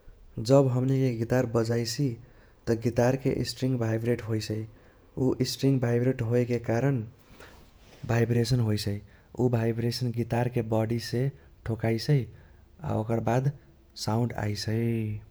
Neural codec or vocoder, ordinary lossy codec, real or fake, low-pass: vocoder, 48 kHz, 128 mel bands, Vocos; none; fake; none